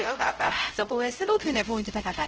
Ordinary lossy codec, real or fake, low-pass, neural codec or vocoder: Opus, 16 kbps; fake; 7.2 kHz; codec, 16 kHz, 0.5 kbps, X-Codec, HuBERT features, trained on LibriSpeech